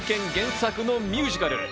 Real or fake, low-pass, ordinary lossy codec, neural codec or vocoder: real; none; none; none